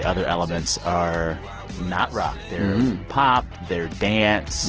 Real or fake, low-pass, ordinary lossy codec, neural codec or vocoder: real; 7.2 kHz; Opus, 16 kbps; none